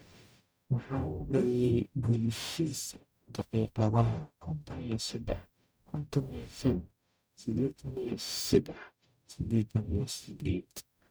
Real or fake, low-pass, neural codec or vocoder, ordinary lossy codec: fake; none; codec, 44.1 kHz, 0.9 kbps, DAC; none